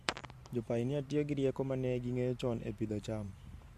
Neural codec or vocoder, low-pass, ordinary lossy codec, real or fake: vocoder, 44.1 kHz, 128 mel bands every 512 samples, BigVGAN v2; 14.4 kHz; MP3, 64 kbps; fake